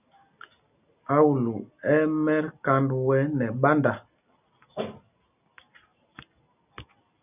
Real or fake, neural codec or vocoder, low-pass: real; none; 3.6 kHz